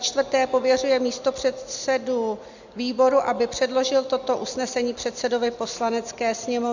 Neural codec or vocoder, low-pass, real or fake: none; 7.2 kHz; real